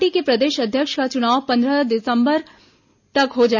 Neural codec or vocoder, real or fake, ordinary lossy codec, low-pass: none; real; none; 7.2 kHz